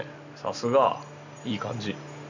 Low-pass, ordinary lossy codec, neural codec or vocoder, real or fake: 7.2 kHz; none; none; real